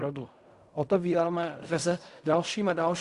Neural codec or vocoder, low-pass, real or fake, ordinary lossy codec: codec, 16 kHz in and 24 kHz out, 0.4 kbps, LongCat-Audio-Codec, fine tuned four codebook decoder; 10.8 kHz; fake; Opus, 64 kbps